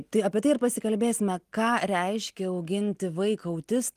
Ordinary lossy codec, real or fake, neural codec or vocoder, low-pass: Opus, 24 kbps; real; none; 14.4 kHz